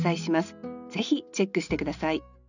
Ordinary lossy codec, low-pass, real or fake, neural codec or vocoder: none; 7.2 kHz; real; none